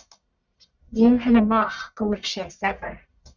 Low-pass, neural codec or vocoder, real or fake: 7.2 kHz; codec, 44.1 kHz, 1.7 kbps, Pupu-Codec; fake